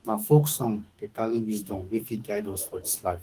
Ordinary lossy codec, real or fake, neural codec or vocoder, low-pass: Opus, 24 kbps; fake; autoencoder, 48 kHz, 32 numbers a frame, DAC-VAE, trained on Japanese speech; 14.4 kHz